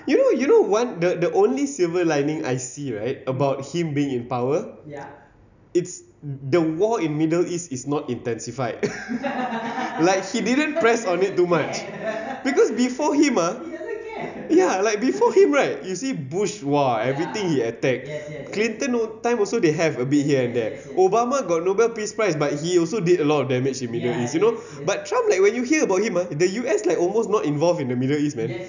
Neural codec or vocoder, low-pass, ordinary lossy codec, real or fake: none; 7.2 kHz; none; real